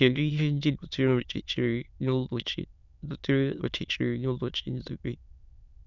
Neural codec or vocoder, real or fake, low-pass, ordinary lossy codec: autoencoder, 22.05 kHz, a latent of 192 numbers a frame, VITS, trained on many speakers; fake; 7.2 kHz; none